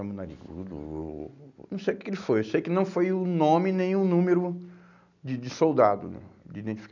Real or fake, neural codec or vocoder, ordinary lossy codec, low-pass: real; none; none; 7.2 kHz